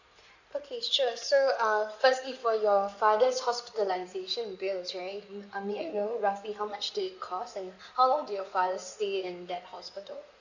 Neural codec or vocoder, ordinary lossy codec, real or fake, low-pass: codec, 16 kHz in and 24 kHz out, 2.2 kbps, FireRedTTS-2 codec; none; fake; 7.2 kHz